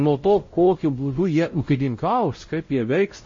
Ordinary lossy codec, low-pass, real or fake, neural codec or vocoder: MP3, 32 kbps; 7.2 kHz; fake; codec, 16 kHz, 0.5 kbps, X-Codec, WavLM features, trained on Multilingual LibriSpeech